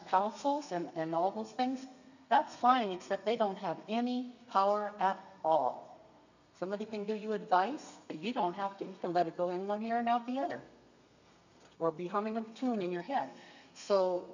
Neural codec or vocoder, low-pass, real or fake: codec, 32 kHz, 1.9 kbps, SNAC; 7.2 kHz; fake